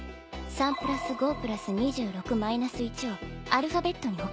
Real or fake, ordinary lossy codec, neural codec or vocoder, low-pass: real; none; none; none